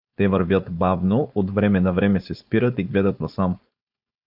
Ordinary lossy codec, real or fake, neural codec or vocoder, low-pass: AAC, 48 kbps; fake; codec, 16 kHz, 4.8 kbps, FACodec; 5.4 kHz